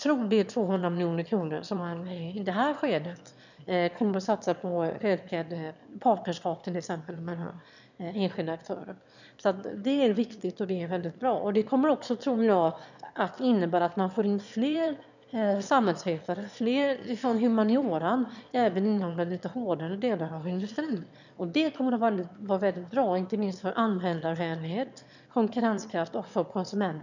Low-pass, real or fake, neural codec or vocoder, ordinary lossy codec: 7.2 kHz; fake; autoencoder, 22.05 kHz, a latent of 192 numbers a frame, VITS, trained on one speaker; none